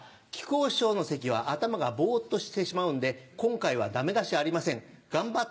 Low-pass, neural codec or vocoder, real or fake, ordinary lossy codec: none; none; real; none